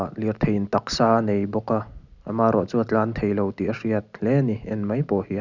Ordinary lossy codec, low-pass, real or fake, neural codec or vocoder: none; 7.2 kHz; real; none